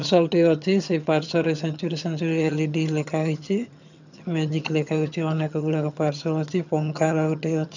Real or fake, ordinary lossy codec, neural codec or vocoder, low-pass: fake; none; vocoder, 22.05 kHz, 80 mel bands, HiFi-GAN; 7.2 kHz